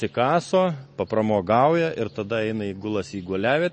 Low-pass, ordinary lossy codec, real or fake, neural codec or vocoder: 10.8 kHz; MP3, 32 kbps; real; none